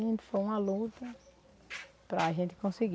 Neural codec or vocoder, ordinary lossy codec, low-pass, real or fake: none; none; none; real